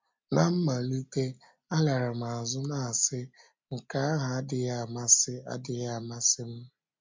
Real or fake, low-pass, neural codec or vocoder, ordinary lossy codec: real; 7.2 kHz; none; MP3, 64 kbps